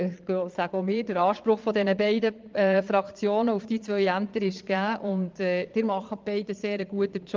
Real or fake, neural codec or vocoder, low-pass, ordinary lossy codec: fake; vocoder, 22.05 kHz, 80 mel bands, Vocos; 7.2 kHz; Opus, 32 kbps